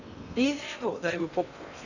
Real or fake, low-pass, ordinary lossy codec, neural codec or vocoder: fake; 7.2 kHz; none; codec, 16 kHz in and 24 kHz out, 0.8 kbps, FocalCodec, streaming, 65536 codes